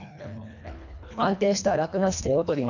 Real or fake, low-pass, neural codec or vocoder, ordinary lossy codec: fake; 7.2 kHz; codec, 24 kHz, 1.5 kbps, HILCodec; none